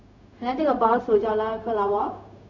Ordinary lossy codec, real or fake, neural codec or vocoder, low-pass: none; fake; codec, 16 kHz, 0.4 kbps, LongCat-Audio-Codec; 7.2 kHz